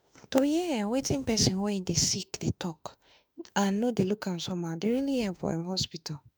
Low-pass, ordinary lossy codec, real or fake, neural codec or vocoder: none; none; fake; autoencoder, 48 kHz, 32 numbers a frame, DAC-VAE, trained on Japanese speech